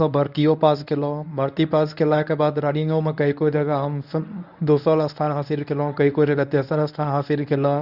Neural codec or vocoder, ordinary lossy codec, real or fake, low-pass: codec, 24 kHz, 0.9 kbps, WavTokenizer, medium speech release version 1; none; fake; 5.4 kHz